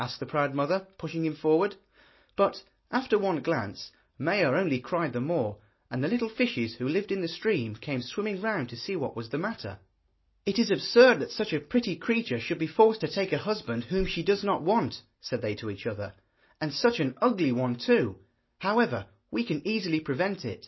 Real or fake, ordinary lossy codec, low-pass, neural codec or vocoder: real; MP3, 24 kbps; 7.2 kHz; none